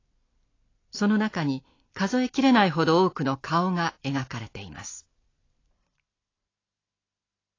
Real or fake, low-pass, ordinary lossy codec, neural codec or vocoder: real; 7.2 kHz; AAC, 32 kbps; none